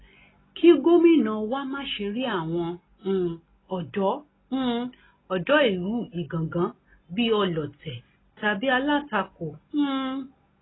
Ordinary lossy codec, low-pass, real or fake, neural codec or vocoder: AAC, 16 kbps; 7.2 kHz; real; none